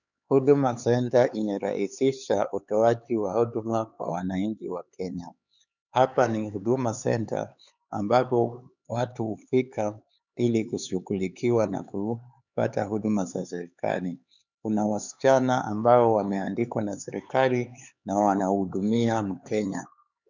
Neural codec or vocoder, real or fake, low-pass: codec, 16 kHz, 4 kbps, X-Codec, HuBERT features, trained on LibriSpeech; fake; 7.2 kHz